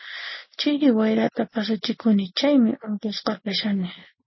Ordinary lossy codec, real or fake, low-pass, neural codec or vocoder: MP3, 24 kbps; real; 7.2 kHz; none